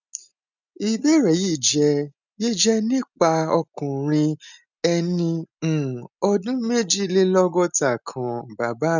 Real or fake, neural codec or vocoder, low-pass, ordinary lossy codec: real; none; 7.2 kHz; none